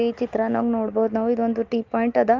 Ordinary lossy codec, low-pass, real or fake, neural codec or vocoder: Opus, 32 kbps; 7.2 kHz; real; none